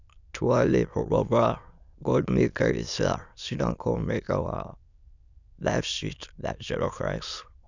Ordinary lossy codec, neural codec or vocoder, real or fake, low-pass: none; autoencoder, 22.05 kHz, a latent of 192 numbers a frame, VITS, trained on many speakers; fake; 7.2 kHz